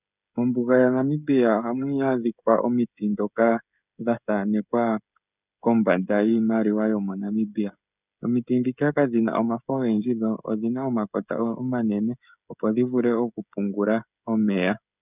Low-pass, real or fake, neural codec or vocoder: 3.6 kHz; fake; codec, 16 kHz, 16 kbps, FreqCodec, smaller model